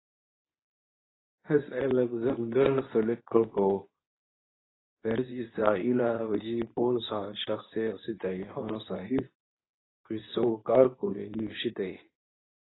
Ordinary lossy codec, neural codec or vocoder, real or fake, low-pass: AAC, 16 kbps; codec, 24 kHz, 0.9 kbps, WavTokenizer, medium speech release version 2; fake; 7.2 kHz